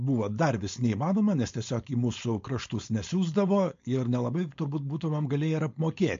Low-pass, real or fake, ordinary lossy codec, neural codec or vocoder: 7.2 kHz; fake; MP3, 48 kbps; codec, 16 kHz, 4.8 kbps, FACodec